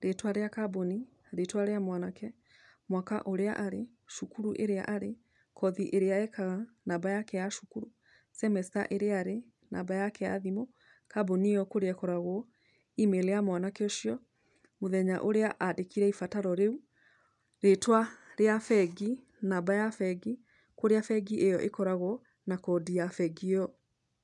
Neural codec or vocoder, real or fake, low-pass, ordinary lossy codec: none; real; 10.8 kHz; none